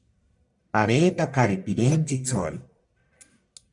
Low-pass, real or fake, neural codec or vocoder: 10.8 kHz; fake; codec, 44.1 kHz, 1.7 kbps, Pupu-Codec